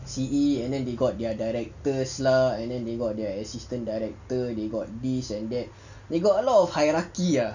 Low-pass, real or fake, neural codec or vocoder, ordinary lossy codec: 7.2 kHz; real; none; none